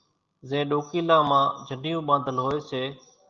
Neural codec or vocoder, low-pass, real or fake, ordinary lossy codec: none; 7.2 kHz; real; Opus, 24 kbps